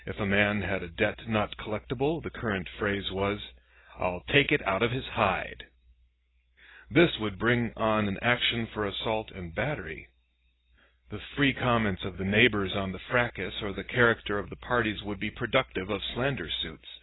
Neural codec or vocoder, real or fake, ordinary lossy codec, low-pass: none; real; AAC, 16 kbps; 7.2 kHz